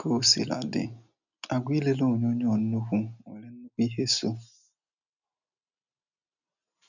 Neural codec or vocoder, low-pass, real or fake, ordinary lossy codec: none; 7.2 kHz; real; none